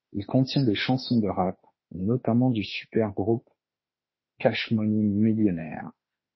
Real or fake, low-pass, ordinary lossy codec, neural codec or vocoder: fake; 7.2 kHz; MP3, 24 kbps; autoencoder, 48 kHz, 32 numbers a frame, DAC-VAE, trained on Japanese speech